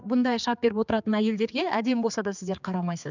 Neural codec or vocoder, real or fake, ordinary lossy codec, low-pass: codec, 16 kHz, 4 kbps, X-Codec, HuBERT features, trained on general audio; fake; none; 7.2 kHz